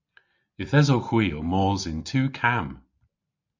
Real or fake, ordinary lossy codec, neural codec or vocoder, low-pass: real; MP3, 64 kbps; none; 7.2 kHz